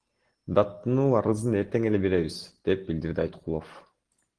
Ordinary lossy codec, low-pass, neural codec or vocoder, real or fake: Opus, 16 kbps; 9.9 kHz; none; real